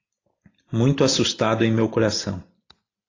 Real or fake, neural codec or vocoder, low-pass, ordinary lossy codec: real; none; 7.2 kHz; AAC, 32 kbps